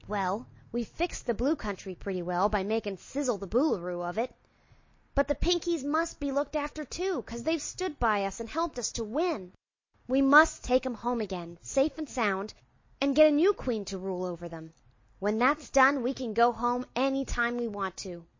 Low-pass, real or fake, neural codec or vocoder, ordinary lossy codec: 7.2 kHz; real; none; MP3, 32 kbps